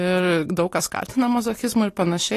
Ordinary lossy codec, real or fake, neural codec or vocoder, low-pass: AAC, 48 kbps; real; none; 14.4 kHz